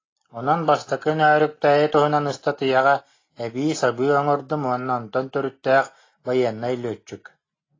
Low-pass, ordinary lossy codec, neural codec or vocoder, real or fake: 7.2 kHz; AAC, 32 kbps; none; real